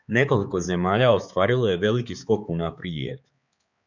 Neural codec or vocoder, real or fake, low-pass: codec, 16 kHz, 4 kbps, X-Codec, HuBERT features, trained on balanced general audio; fake; 7.2 kHz